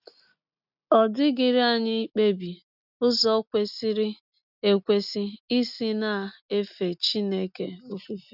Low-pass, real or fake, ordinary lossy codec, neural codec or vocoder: 5.4 kHz; real; none; none